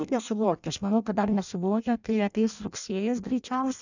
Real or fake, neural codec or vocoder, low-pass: fake; codec, 16 kHz in and 24 kHz out, 0.6 kbps, FireRedTTS-2 codec; 7.2 kHz